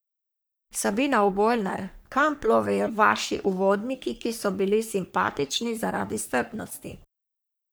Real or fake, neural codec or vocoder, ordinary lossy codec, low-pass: fake; codec, 44.1 kHz, 3.4 kbps, Pupu-Codec; none; none